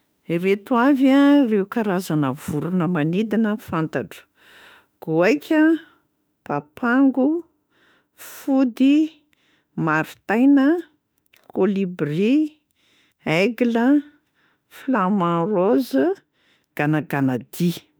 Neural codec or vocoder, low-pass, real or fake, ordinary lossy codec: autoencoder, 48 kHz, 32 numbers a frame, DAC-VAE, trained on Japanese speech; none; fake; none